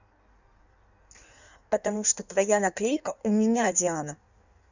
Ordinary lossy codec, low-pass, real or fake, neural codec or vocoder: none; 7.2 kHz; fake; codec, 16 kHz in and 24 kHz out, 1.1 kbps, FireRedTTS-2 codec